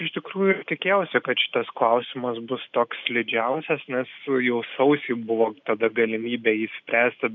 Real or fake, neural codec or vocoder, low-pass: real; none; 7.2 kHz